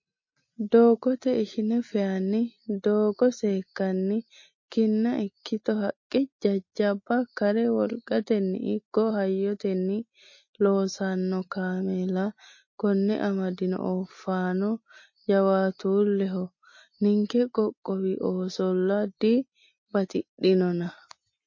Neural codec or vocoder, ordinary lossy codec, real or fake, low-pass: none; MP3, 32 kbps; real; 7.2 kHz